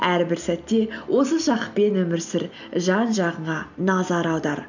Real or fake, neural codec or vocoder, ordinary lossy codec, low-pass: real; none; none; 7.2 kHz